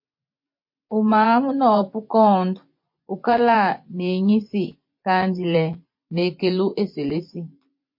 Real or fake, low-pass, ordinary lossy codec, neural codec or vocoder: fake; 5.4 kHz; MP3, 32 kbps; vocoder, 24 kHz, 100 mel bands, Vocos